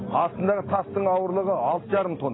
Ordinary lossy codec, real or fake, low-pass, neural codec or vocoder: AAC, 16 kbps; real; 7.2 kHz; none